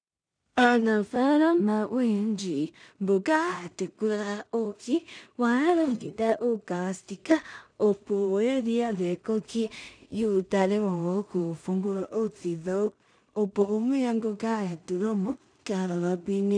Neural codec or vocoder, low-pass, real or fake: codec, 16 kHz in and 24 kHz out, 0.4 kbps, LongCat-Audio-Codec, two codebook decoder; 9.9 kHz; fake